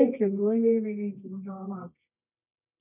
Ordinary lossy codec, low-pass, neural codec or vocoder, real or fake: none; 3.6 kHz; codec, 24 kHz, 0.9 kbps, WavTokenizer, medium music audio release; fake